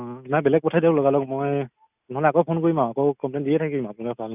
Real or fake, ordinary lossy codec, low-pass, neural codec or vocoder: real; none; 3.6 kHz; none